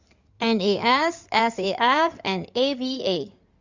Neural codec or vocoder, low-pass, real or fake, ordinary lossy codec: codec, 16 kHz in and 24 kHz out, 2.2 kbps, FireRedTTS-2 codec; 7.2 kHz; fake; Opus, 64 kbps